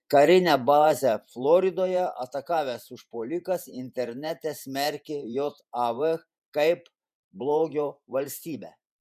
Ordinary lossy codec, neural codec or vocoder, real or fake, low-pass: MP3, 96 kbps; vocoder, 48 kHz, 128 mel bands, Vocos; fake; 14.4 kHz